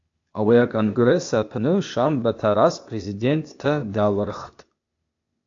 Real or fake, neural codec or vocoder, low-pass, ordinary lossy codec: fake; codec, 16 kHz, 0.8 kbps, ZipCodec; 7.2 kHz; AAC, 48 kbps